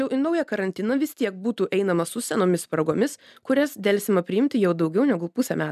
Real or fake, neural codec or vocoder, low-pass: real; none; 14.4 kHz